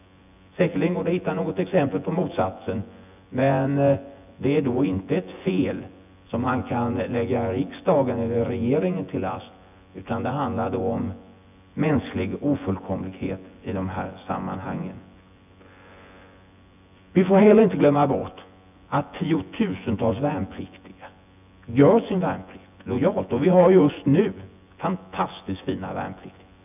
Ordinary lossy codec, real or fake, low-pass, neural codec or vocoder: none; fake; 3.6 kHz; vocoder, 24 kHz, 100 mel bands, Vocos